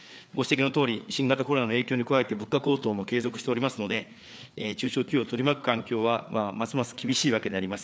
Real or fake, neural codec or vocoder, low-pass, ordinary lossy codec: fake; codec, 16 kHz, 4 kbps, FreqCodec, larger model; none; none